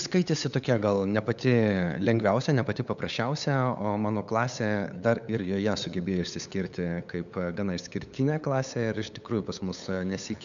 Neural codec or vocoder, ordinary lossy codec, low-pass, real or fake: codec, 16 kHz, 8 kbps, FunCodec, trained on LibriTTS, 25 frames a second; MP3, 96 kbps; 7.2 kHz; fake